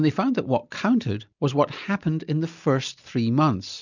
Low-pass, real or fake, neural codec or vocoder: 7.2 kHz; real; none